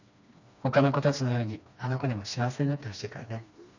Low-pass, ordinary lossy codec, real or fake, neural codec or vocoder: 7.2 kHz; none; fake; codec, 16 kHz, 2 kbps, FreqCodec, smaller model